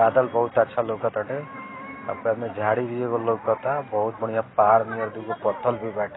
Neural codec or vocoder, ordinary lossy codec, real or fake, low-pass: none; AAC, 16 kbps; real; 7.2 kHz